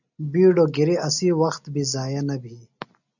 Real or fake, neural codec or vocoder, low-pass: real; none; 7.2 kHz